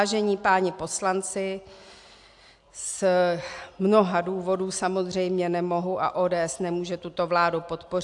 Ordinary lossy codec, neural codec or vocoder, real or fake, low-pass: AAC, 64 kbps; none; real; 10.8 kHz